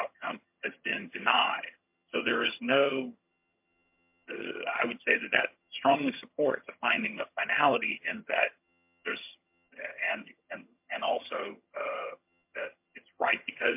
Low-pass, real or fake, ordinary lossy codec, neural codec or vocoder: 3.6 kHz; fake; MP3, 32 kbps; vocoder, 22.05 kHz, 80 mel bands, HiFi-GAN